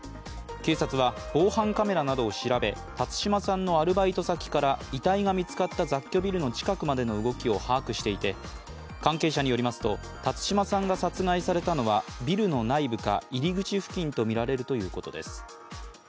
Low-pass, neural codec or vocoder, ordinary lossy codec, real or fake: none; none; none; real